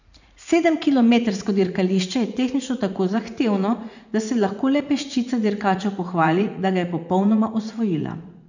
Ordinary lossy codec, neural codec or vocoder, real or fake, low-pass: none; vocoder, 44.1 kHz, 80 mel bands, Vocos; fake; 7.2 kHz